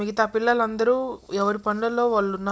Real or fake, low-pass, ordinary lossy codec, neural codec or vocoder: real; none; none; none